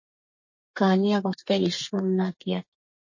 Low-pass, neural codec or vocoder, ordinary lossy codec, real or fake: 7.2 kHz; codec, 32 kHz, 1.9 kbps, SNAC; MP3, 32 kbps; fake